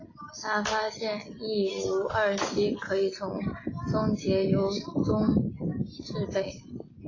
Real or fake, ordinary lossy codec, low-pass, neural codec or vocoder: real; AAC, 32 kbps; 7.2 kHz; none